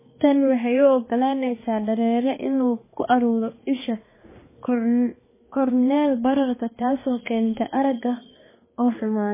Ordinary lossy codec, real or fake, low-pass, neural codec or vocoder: MP3, 16 kbps; fake; 3.6 kHz; codec, 16 kHz, 2 kbps, X-Codec, HuBERT features, trained on balanced general audio